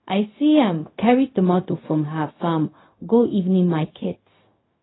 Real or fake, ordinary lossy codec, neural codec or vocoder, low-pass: fake; AAC, 16 kbps; codec, 16 kHz, 0.4 kbps, LongCat-Audio-Codec; 7.2 kHz